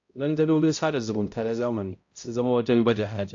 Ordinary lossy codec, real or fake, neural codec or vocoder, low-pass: AAC, 48 kbps; fake; codec, 16 kHz, 0.5 kbps, X-Codec, HuBERT features, trained on balanced general audio; 7.2 kHz